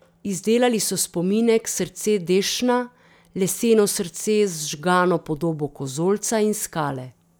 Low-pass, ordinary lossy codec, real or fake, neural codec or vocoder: none; none; real; none